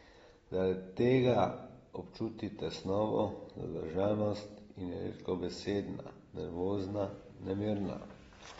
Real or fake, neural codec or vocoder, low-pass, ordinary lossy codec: real; none; 19.8 kHz; AAC, 24 kbps